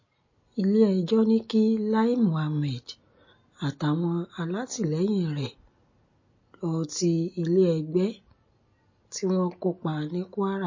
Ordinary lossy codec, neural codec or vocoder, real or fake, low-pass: MP3, 32 kbps; none; real; 7.2 kHz